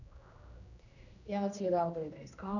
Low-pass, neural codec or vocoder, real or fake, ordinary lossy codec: 7.2 kHz; codec, 16 kHz, 1 kbps, X-Codec, HuBERT features, trained on general audio; fake; none